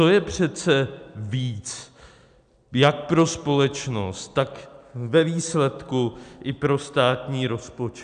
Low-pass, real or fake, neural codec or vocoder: 9.9 kHz; real; none